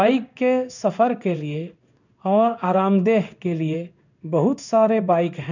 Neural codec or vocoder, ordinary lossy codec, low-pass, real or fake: codec, 16 kHz in and 24 kHz out, 1 kbps, XY-Tokenizer; none; 7.2 kHz; fake